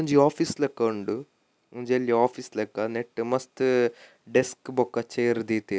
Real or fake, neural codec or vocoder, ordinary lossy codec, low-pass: real; none; none; none